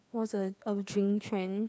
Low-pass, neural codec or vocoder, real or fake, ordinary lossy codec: none; codec, 16 kHz, 2 kbps, FreqCodec, larger model; fake; none